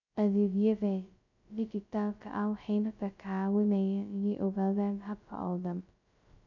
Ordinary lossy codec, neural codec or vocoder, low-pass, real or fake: none; codec, 16 kHz, 0.2 kbps, FocalCodec; 7.2 kHz; fake